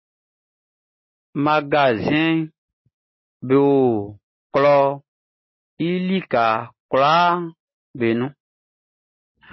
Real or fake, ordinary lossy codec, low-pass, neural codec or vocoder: real; MP3, 24 kbps; 7.2 kHz; none